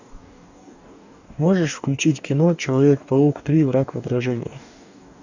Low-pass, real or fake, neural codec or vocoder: 7.2 kHz; fake; codec, 44.1 kHz, 2.6 kbps, DAC